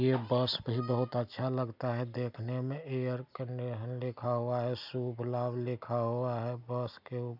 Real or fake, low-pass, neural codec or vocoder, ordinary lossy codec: real; 5.4 kHz; none; none